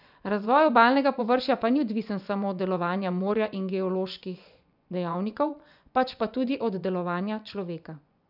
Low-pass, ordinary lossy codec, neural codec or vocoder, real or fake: 5.4 kHz; none; vocoder, 24 kHz, 100 mel bands, Vocos; fake